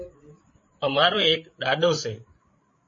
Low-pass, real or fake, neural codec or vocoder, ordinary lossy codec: 7.2 kHz; fake; codec, 16 kHz, 8 kbps, FreqCodec, larger model; MP3, 32 kbps